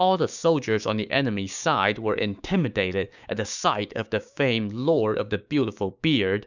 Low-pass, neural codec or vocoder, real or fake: 7.2 kHz; codec, 16 kHz, 6 kbps, DAC; fake